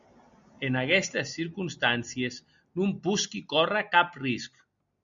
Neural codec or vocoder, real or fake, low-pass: none; real; 7.2 kHz